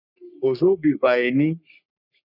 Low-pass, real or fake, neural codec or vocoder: 5.4 kHz; fake; codec, 44.1 kHz, 3.4 kbps, Pupu-Codec